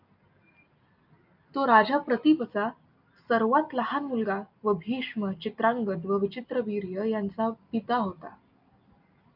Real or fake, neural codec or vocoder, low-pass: real; none; 5.4 kHz